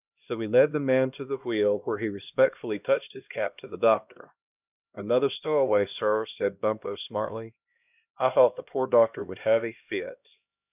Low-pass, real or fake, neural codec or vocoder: 3.6 kHz; fake; codec, 16 kHz, 1 kbps, X-Codec, HuBERT features, trained on LibriSpeech